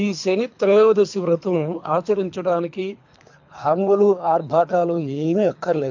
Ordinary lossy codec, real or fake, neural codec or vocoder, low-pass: MP3, 48 kbps; fake; codec, 24 kHz, 3 kbps, HILCodec; 7.2 kHz